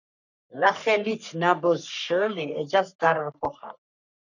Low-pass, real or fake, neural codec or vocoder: 7.2 kHz; fake; codec, 44.1 kHz, 3.4 kbps, Pupu-Codec